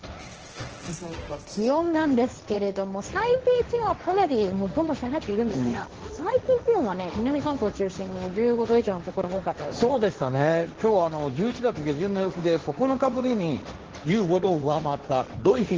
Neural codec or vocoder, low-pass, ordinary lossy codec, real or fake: codec, 16 kHz, 1.1 kbps, Voila-Tokenizer; 7.2 kHz; Opus, 16 kbps; fake